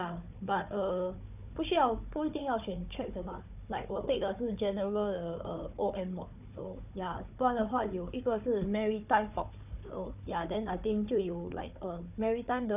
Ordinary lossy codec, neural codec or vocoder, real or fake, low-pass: none; codec, 16 kHz, 4 kbps, FunCodec, trained on Chinese and English, 50 frames a second; fake; 3.6 kHz